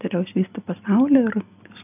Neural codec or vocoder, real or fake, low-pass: none; real; 3.6 kHz